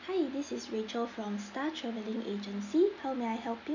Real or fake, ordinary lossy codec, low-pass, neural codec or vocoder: real; none; 7.2 kHz; none